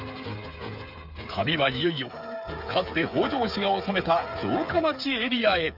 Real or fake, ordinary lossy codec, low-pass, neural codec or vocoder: fake; AAC, 48 kbps; 5.4 kHz; codec, 16 kHz, 8 kbps, FreqCodec, smaller model